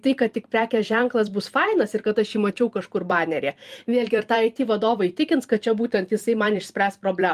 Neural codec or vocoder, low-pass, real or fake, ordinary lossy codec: vocoder, 44.1 kHz, 128 mel bands every 256 samples, BigVGAN v2; 14.4 kHz; fake; Opus, 32 kbps